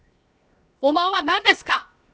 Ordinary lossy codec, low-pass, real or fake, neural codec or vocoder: none; none; fake; codec, 16 kHz, 0.7 kbps, FocalCodec